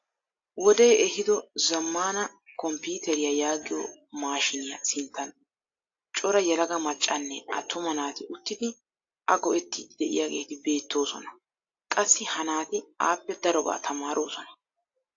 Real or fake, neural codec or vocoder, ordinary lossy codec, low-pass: real; none; AAC, 48 kbps; 7.2 kHz